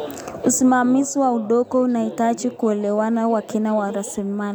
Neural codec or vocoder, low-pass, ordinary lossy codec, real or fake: none; none; none; real